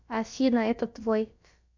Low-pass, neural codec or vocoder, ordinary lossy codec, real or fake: 7.2 kHz; codec, 16 kHz, about 1 kbps, DyCAST, with the encoder's durations; MP3, 64 kbps; fake